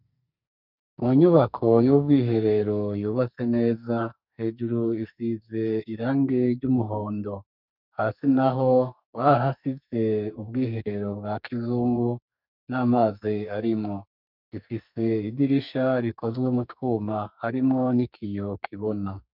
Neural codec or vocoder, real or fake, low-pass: codec, 44.1 kHz, 2.6 kbps, SNAC; fake; 5.4 kHz